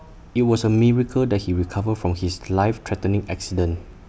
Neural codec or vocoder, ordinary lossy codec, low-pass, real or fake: none; none; none; real